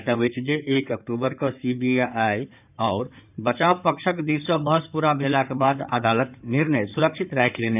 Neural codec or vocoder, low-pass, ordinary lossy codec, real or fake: codec, 16 kHz in and 24 kHz out, 2.2 kbps, FireRedTTS-2 codec; 3.6 kHz; none; fake